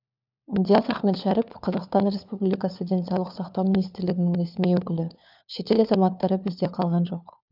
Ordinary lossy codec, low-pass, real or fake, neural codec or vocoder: none; 5.4 kHz; fake; codec, 16 kHz, 4 kbps, FunCodec, trained on LibriTTS, 50 frames a second